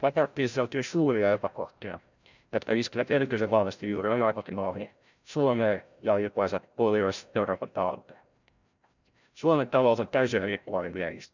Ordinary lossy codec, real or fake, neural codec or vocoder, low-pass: none; fake; codec, 16 kHz, 0.5 kbps, FreqCodec, larger model; 7.2 kHz